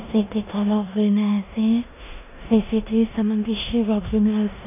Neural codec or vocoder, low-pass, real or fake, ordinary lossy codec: codec, 16 kHz in and 24 kHz out, 0.9 kbps, LongCat-Audio-Codec, four codebook decoder; 3.6 kHz; fake; none